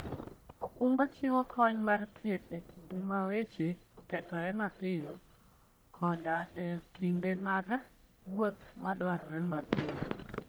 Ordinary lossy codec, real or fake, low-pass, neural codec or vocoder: none; fake; none; codec, 44.1 kHz, 1.7 kbps, Pupu-Codec